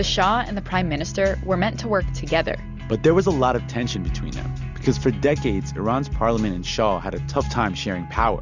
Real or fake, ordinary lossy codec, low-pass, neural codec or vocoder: real; Opus, 64 kbps; 7.2 kHz; none